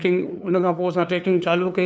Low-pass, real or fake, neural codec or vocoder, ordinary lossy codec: none; fake; codec, 16 kHz, 2 kbps, FunCodec, trained on LibriTTS, 25 frames a second; none